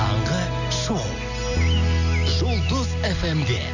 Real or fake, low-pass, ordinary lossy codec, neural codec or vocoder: real; 7.2 kHz; none; none